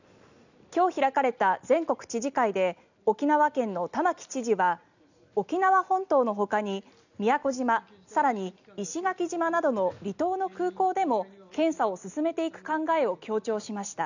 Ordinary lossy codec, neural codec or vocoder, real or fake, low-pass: none; none; real; 7.2 kHz